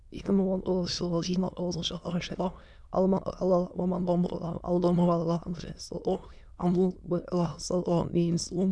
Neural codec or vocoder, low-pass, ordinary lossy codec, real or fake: autoencoder, 22.05 kHz, a latent of 192 numbers a frame, VITS, trained on many speakers; none; none; fake